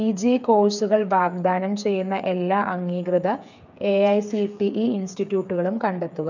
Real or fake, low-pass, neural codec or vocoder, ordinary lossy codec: fake; 7.2 kHz; codec, 16 kHz, 8 kbps, FreqCodec, smaller model; none